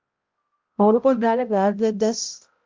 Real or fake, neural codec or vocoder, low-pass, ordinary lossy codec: fake; codec, 16 kHz, 0.5 kbps, X-Codec, HuBERT features, trained on balanced general audio; 7.2 kHz; Opus, 24 kbps